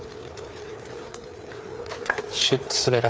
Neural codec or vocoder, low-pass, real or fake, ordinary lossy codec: codec, 16 kHz, 4 kbps, FreqCodec, larger model; none; fake; none